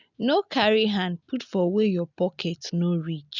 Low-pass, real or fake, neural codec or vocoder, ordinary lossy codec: 7.2 kHz; real; none; none